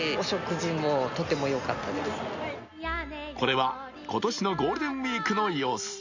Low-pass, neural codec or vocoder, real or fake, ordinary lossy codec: 7.2 kHz; none; real; Opus, 64 kbps